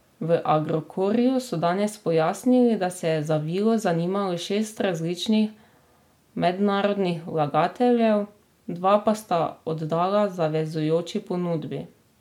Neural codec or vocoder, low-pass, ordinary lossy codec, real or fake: none; 19.8 kHz; MP3, 96 kbps; real